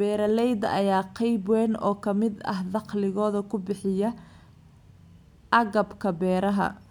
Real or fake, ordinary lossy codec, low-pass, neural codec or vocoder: real; none; 19.8 kHz; none